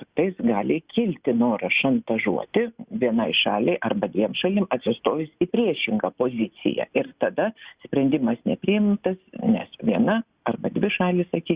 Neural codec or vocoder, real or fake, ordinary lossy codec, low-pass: none; real; Opus, 64 kbps; 3.6 kHz